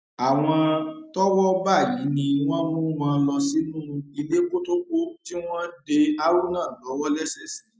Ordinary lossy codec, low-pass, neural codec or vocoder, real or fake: none; none; none; real